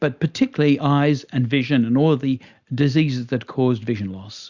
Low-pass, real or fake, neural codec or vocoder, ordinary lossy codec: 7.2 kHz; real; none; Opus, 64 kbps